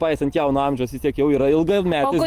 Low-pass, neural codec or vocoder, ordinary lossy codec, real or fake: 14.4 kHz; none; Opus, 32 kbps; real